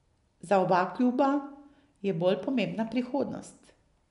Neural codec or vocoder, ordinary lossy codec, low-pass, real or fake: none; none; 10.8 kHz; real